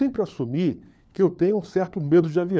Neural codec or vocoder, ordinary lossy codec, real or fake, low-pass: codec, 16 kHz, 4 kbps, FunCodec, trained on LibriTTS, 50 frames a second; none; fake; none